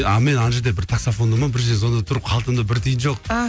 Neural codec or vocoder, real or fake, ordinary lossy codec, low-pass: none; real; none; none